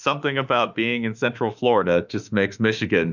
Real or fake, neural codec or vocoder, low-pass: fake; autoencoder, 48 kHz, 32 numbers a frame, DAC-VAE, trained on Japanese speech; 7.2 kHz